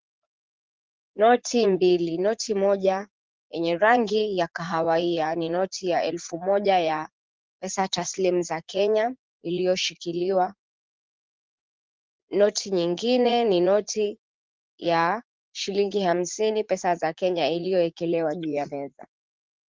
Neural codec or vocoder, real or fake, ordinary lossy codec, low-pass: vocoder, 44.1 kHz, 80 mel bands, Vocos; fake; Opus, 16 kbps; 7.2 kHz